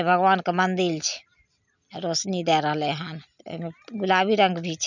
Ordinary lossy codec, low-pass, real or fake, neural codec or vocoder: none; 7.2 kHz; real; none